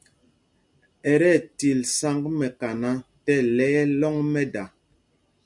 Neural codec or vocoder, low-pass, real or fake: none; 10.8 kHz; real